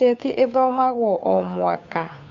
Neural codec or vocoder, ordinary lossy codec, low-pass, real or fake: codec, 16 kHz, 2 kbps, FunCodec, trained on Chinese and English, 25 frames a second; MP3, 64 kbps; 7.2 kHz; fake